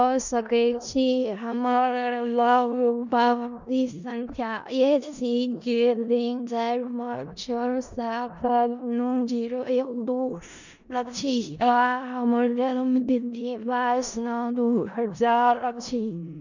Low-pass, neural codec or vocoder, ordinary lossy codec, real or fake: 7.2 kHz; codec, 16 kHz in and 24 kHz out, 0.4 kbps, LongCat-Audio-Codec, four codebook decoder; none; fake